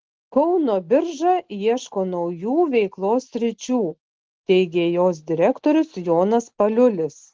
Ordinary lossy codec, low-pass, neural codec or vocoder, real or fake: Opus, 16 kbps; 7.2 kHz; none; real